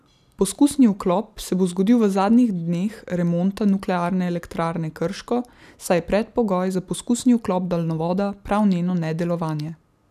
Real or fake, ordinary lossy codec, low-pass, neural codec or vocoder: real; none; 14.4 kHz; none